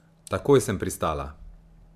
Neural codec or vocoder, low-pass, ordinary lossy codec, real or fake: none; 14.4 kHz; MP3, 96 kbps; real